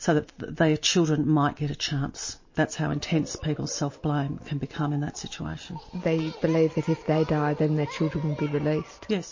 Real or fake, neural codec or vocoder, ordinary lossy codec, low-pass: real; none; MP3, 32 kbps; 7.2 kHz